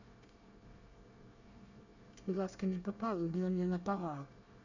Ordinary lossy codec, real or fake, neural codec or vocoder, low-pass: none; fake; codec, 24 kHz, 1 kbps, SNAC; 7.2 kHz